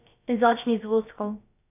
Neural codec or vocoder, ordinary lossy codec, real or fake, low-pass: codec, 16 kHz, about 1 kbps, DyCAST, with the encoder's durations; none; fake; 3.6 kHz